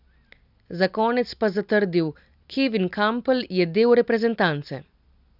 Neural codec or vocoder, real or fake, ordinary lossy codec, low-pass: none; real; none; 5.4 kHz